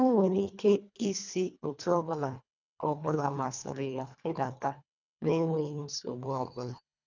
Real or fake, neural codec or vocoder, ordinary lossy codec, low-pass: fake; codec, 24 kHz, 1.5 kbps, HILCodec; none; 7.2 kHz